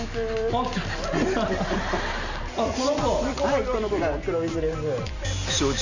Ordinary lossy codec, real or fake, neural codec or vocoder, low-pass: none; real; none; 7.2 kHz